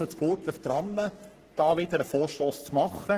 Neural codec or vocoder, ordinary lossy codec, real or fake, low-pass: codec, 44.1 kHz, 3.4 kbps, Pupu-Codec; Opus, 16 kbps; fake; 14.4 kHz